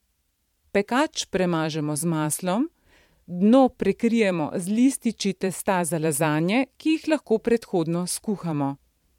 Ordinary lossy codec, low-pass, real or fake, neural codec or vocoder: MP3, 96 kbps; 19.8 kHz; fake; vocoder, 44.1 kHz, 128 mel bands every 512 samples, BigVGAN v2